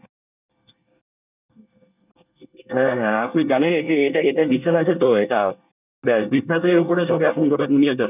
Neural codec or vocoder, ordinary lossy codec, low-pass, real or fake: codec, 24 kHz, 1 kbps, SNAC; none; 3.6 kHz; fake